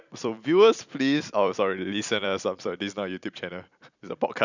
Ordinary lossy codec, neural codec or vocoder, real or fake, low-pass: none; vocoder, 44.1 kHz, 128 mel bands every 256 samples, BigVGAN v2; fake; 7.2 kHz